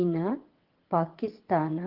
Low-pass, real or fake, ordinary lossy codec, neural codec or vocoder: 5.4 kHz; fake; Opus, 16 kbps; vocoder, 22.05 kHz, 80 mel bands, WaveNeXt